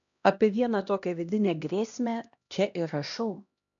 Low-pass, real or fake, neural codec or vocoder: 7.2 kHz; fake; codec, 16 kHz, 1 kbps, X-Codec, HuBERT features, trained on LibriSpeech